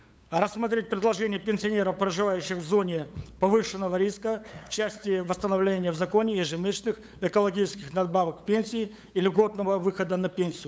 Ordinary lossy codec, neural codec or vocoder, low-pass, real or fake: none; codec, 16 kHz, 8 kbps, FunCodec, trained on LibriTTS, 25 frames a second; none; fake